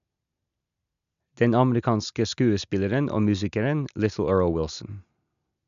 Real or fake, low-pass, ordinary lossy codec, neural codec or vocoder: real; 7.2 kHz; none; none